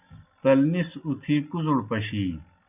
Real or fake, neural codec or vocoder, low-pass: real; none; 3.6 kHz